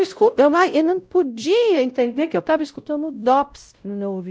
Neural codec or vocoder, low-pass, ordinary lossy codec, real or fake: codec, 16 kHz, 0.5 kbps, X-Codec, WavLM features, trained on Multilingual LibriSpeech; none; none; fake